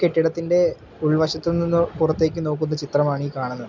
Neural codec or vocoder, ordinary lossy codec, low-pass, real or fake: none; none; 7.2 kHz; real